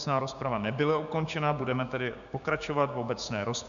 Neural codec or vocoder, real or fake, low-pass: codec, 16 kHz, 6 kbps, DAC; fake; 7.2 kHz